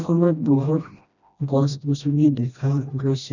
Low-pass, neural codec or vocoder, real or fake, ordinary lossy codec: 7.2 kHz; codec, 16 kHz, 1 kbps, FreqCodec, smaller model; fake; none